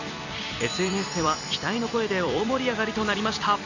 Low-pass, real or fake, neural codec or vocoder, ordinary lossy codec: 7.2 kHz; fake; vocoder, 44.1 kHz, 128 mel bands every 256 samples, BigVGAN v2; none